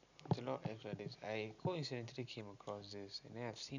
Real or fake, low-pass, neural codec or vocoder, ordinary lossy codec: real; 7.2 kHz; none; none